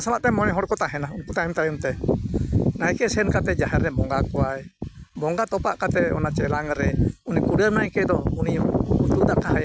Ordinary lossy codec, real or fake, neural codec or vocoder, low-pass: none; real; none; none